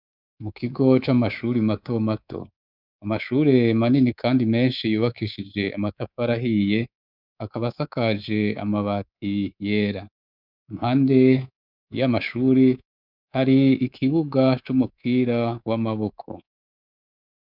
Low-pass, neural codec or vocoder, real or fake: 5.4 kHz; codec, 24 kHz, 3.1 kbps, DualCodec; fake